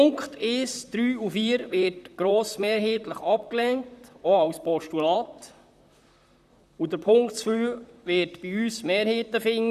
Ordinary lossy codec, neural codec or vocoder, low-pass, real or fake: none; vocoder, 44.1 kHz, 128 mel bands, Pupu-Vocoder; 14.4 kHz; fake